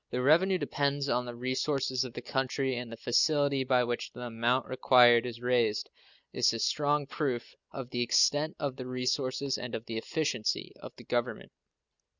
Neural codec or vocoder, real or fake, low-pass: none; real; 7.2 kHz